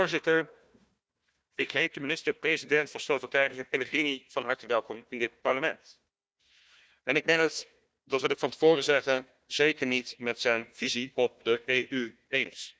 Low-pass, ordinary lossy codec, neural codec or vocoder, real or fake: none; none; codec, 16 kHz, 1 kbps, FunCodec, trained on Chinese and English, 50 frames a second; fake